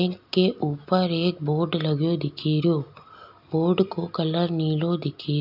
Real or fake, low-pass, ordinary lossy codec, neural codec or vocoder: real; 5.4 kHz; none; none